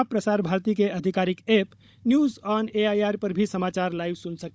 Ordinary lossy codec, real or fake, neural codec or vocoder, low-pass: none; fake; codec, 16 kHz, 16 kbps, FunCodec, trained on Chinese and English, 50 frames a second; none